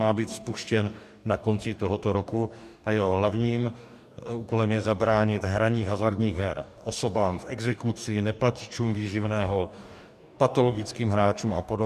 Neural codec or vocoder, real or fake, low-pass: codec, 44.1 kHz, 2.6 kbps, DAC; fake; 14.4 kHz